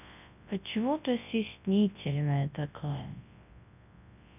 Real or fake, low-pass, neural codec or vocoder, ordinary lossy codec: fake; 3.6 kHz; codec, 24 kHz, 0.9 kbps, WavTokenizer, large speech release; none